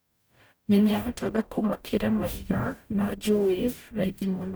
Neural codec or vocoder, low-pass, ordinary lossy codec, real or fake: codec, 44.1 kHz, 0.9 kbps, DAC; none; none; fake